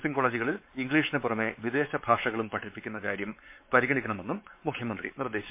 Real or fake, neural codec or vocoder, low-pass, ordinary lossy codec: fake; codec, 16 kHz, 4 kbps, X-Codec, WavLM features, trained on Multilingual LibriSpeech; 3.6 kHz; MP3, 24 kbps